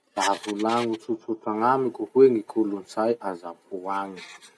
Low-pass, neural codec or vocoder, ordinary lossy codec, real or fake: none; none; none; real